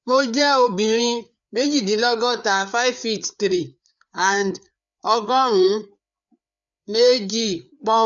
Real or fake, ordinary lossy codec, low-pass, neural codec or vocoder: fake; none; 7.2 kHz; codec, 16 kHz, 4 kbps, FreqCodec, larger model